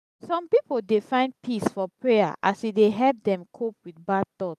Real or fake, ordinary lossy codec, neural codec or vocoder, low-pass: real; none; none; 14.4 kHz